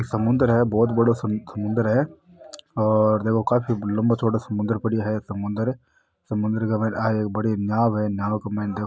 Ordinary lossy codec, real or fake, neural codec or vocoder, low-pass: none; real; none; none